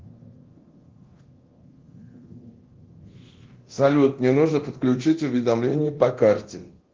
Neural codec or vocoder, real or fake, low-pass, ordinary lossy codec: codec, 24 kHz, 0.9 kbps, DualCodec; fake; 7.2 kHz; Opus, 16 kbps